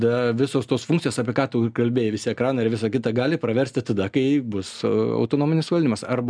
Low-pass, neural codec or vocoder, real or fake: 9.9 kHz; none; real